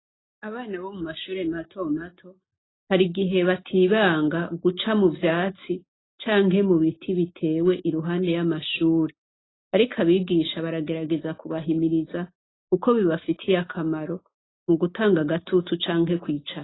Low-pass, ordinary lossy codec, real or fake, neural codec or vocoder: 7.2 kHz; AAC, 16 kbps; real; none